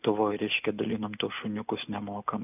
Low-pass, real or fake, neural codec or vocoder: 3.6 kHz; real; none